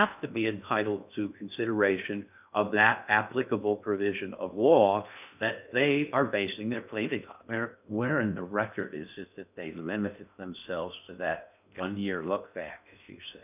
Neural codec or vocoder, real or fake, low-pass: codec, 16 kHz in and 24 kHz out, 0.6 kbps, FocalCodec, streaming, 2048 codes; fake; 3.6 kHz